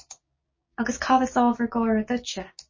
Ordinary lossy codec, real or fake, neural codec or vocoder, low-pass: MP3, 32 kbps; fake; codec, 16 kHz, 6 kbps, DAC; 7.2 kHz